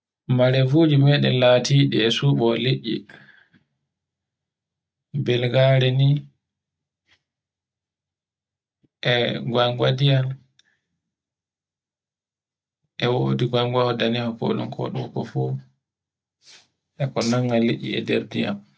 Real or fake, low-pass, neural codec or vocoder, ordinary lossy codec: real; none; none; none